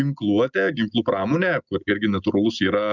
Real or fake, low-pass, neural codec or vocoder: real; 7.2 kHz; none